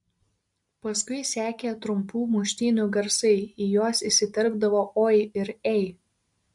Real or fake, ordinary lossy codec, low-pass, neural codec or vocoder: real; MP3, 64 kbps; 10.8 kHz; none